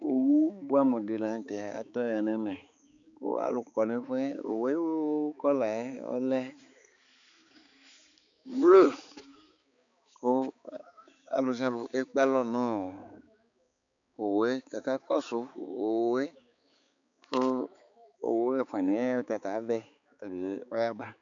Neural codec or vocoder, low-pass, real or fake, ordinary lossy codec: codec, 16 kHz, 4 kbps, X-Codec, HuBERT features, trained on balanced general audio; 7.2 kHz; fake; MP3, 64 kbps